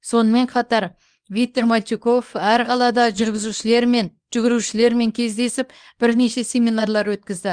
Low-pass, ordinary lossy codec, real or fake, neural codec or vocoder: 9.9 kHz; Opus, 32 kbps; fake; codec, 24 kHz, 0.9 kbps, WavTokenizer, small release